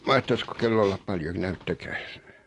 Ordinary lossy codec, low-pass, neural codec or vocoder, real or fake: AAC, 48 kbps; 10.8 kHz; none; real